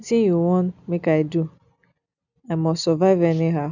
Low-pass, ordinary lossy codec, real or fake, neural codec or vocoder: 7.2 kHz; none; real; none